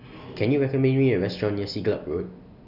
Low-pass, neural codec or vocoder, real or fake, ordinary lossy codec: 5.4 kHz; none; real; none